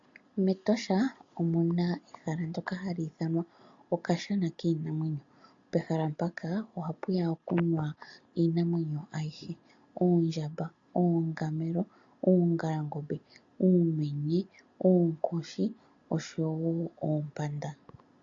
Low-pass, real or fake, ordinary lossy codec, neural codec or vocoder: 7.2 kHz; real; AAC, 48 kbps; none